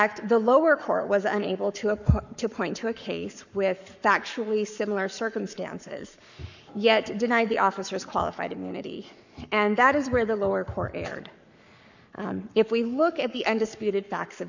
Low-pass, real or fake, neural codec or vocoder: 7.2 kHz; fake; codec, 44.1 kHz, 7.8 kbps, Pupu-Codec